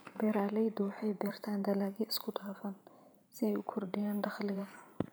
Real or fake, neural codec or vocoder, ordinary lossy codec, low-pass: fake; vocoder, 44.1 kHz, 128 mel bands every 512 samples, BigVGAN v2; none; none